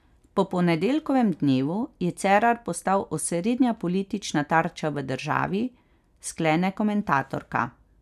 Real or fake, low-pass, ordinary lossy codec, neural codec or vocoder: real; 14.4 kHz; none; none